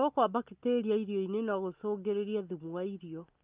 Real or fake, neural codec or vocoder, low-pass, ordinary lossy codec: real; none; 3.6 kHz; Opus, 24 kbps